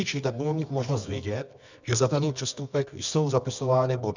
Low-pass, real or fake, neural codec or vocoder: 7.2 kHz; fake; codec, 24 kHz, 0.9 kbps, WavTokenizer, medium music audio release